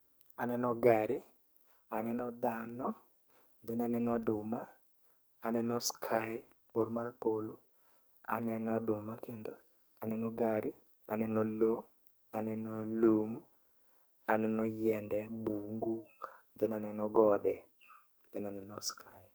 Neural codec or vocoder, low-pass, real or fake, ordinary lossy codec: codec, 44.1 kHz, 2.6 kbps, SNAC; none; fake; none